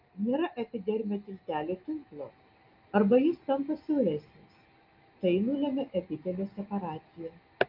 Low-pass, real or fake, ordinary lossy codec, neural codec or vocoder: 5.4 kHz; real; Opus, 24 kbps; none